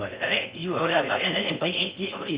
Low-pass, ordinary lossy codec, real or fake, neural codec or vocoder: 3.6 kHz; Opus, 64 kbps; fake; codec, 16 kHz in and 24 kHz out, 0.6 kbps, FocalCodec, streaming, 4096 codes